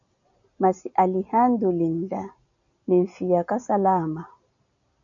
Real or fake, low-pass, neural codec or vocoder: real; 7.2 kHz; none